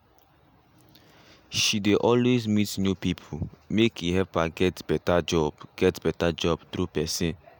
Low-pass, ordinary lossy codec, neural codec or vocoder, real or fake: none; none; none; real